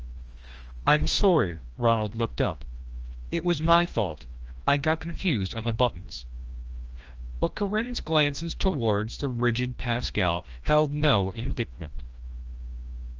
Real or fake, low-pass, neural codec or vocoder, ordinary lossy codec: fake; 7.2 kHz; codec, 16 kHz, 1 kbps, FreqCodec, larger model; Opus, 24 kbps